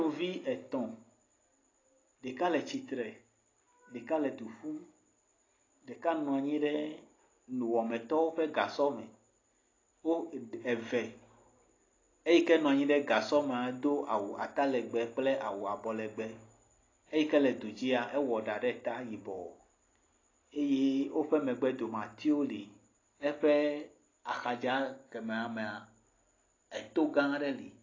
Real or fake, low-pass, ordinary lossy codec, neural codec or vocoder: real; 7.2 kHz; AAC, 32 kbps; none